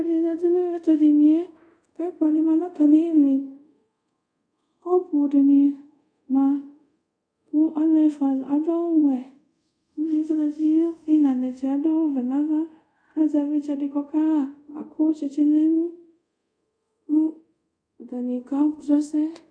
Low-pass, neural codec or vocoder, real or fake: 9.9 kHz; codec, 24 kHz, 0.5 kbps, DualCodec; fake